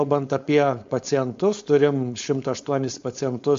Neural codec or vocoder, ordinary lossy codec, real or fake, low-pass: codec, 16 kHz, 4.8 kbps, FACodec; MP3, 48 kbps; fake; 7.2 kHz